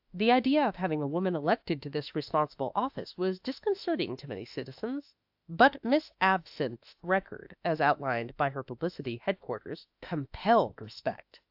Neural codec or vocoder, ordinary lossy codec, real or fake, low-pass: autoencoder, 48 kHz, 32 numbers a frame, DAC-VAE, trained on Japanese speech; AAC, 48 kbps; fake; 5.4 kHz